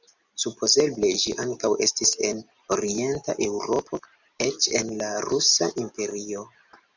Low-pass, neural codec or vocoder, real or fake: 7.2 kHz; none; real